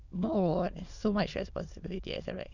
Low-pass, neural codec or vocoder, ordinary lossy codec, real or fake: 7.2 kHz; autoencoder, 22.05 kHz, a latent of 192 numbers a frame, VITS, trained on many speakers; none; fake